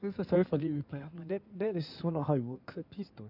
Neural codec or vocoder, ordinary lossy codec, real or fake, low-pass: codec, 16 kHz in and 24 kHz out, 2.2 kbps, FireRedTTS-2 codec; none; fake; 5.4 kHz